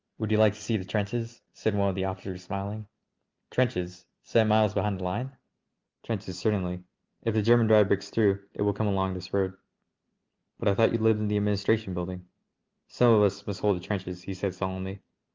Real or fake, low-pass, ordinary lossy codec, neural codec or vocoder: real; 7.2 kHz; Opus, 32 kbps; none